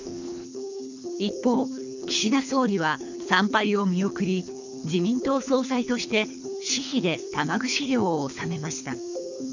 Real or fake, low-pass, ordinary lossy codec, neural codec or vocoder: fake; 7.2 kHz; none; codec, 24 kHz, 3 kbps, HILCodec